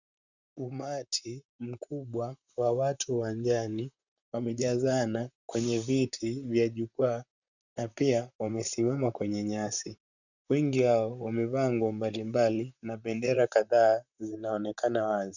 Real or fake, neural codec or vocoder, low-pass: fake; vocoder, 44.1 kHz, 128 mel bands, Pupu-Vocoder; 7.2 kHz